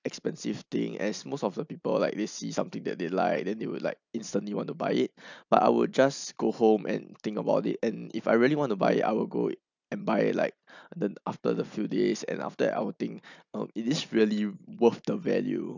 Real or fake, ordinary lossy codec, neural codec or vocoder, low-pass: real; none; none; 7.2 kHz